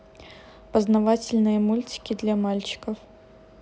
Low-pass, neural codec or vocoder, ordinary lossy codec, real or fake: none; none; none; real